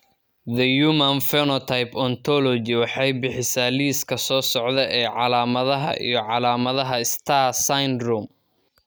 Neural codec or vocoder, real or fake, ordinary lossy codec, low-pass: none; real; none; none